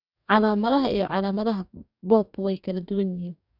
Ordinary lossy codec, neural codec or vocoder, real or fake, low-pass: none; codec, 44.1 kHz, 2.6 kbps, DAC; fake; 5.4 kHz